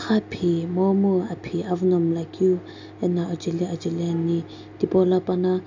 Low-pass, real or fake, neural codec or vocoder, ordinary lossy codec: 7.2 kHz; real; none; AAC, 48 kbps